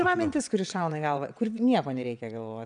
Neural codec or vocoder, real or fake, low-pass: vocoder, 22.05 kHz, 80 mel bands, WaveNeXt; fake; 9.9 kHz